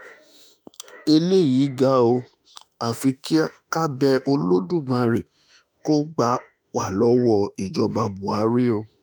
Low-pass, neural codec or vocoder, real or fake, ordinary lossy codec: none; autoencoder, 48 kHz, 32 numbers a frame, DAC-VAE, trained on Japanese speech; fake; none